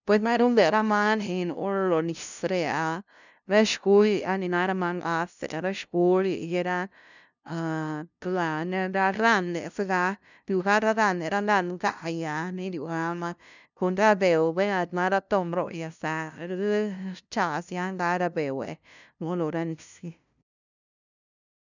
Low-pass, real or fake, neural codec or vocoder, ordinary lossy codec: 7.2 kHz; fake; codec, 16 kHz, 0.5 kbps, FunCodec, trained on LibriTTS, 25 frames a second; none